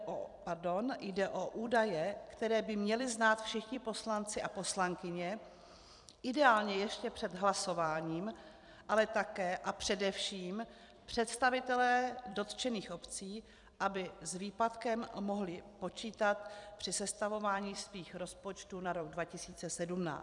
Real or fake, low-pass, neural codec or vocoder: fake; 10.8 kHz; vocoder, 44.1 kHz, 128 mel bands every 256 samples, BigVGAN v2